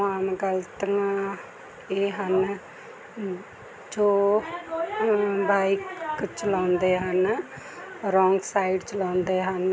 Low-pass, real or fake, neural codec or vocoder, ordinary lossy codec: none; real; none; none